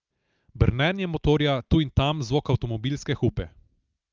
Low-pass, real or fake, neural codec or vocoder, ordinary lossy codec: 7.2 kHz; real; none; Opus, 24 kbps